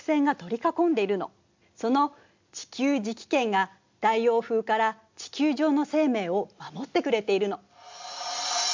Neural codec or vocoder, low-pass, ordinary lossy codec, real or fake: none; 7.2 kHz; MP3, 64 kbps; real